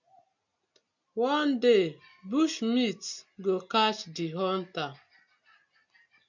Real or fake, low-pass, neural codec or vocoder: real; 7.2 kHz; none